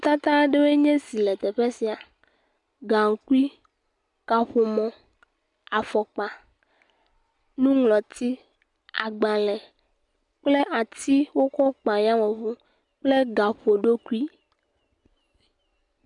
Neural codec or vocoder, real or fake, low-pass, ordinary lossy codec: none; real; 10.8 kHz; MP3, 96 kbps